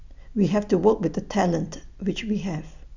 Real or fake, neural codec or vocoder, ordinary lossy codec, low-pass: real; none; none; 7.2 kHz